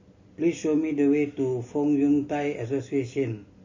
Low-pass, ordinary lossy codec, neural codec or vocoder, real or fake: 7.2 kHz; MP3, 32 kbps; none; real